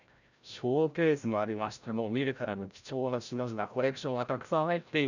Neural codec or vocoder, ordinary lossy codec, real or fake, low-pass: codec, 16 kHz, 0.5 kbps, FreqCodec, larger model; none; fake; 7.2 kHz